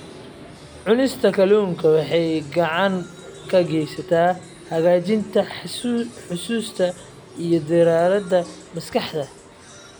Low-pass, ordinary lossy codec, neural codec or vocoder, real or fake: none; none; none; real